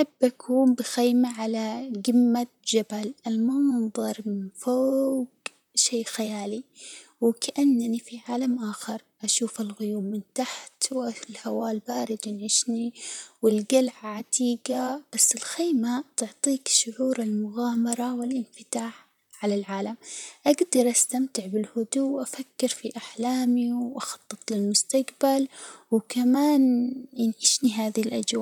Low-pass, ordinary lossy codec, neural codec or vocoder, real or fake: none; none; vocoder, 44.1 kHz, 128 mel bands, Pupu-Vocoder; fake